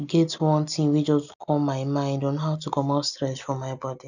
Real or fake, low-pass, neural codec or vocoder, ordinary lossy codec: real; 7.2 kHz; none; none